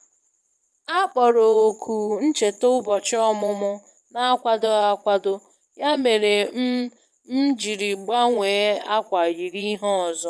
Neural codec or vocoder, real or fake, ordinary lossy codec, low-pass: vocoder, 22.05 kHz, 80 mel bands, Vocos; fake; none; none